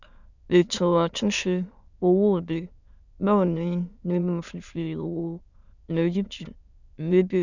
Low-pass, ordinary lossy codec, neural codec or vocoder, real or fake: 7.2 kHz; none; autoencoder, 22.05 kHz, a latent of 192 numbers a frame, VITS, trained on many speakers; fake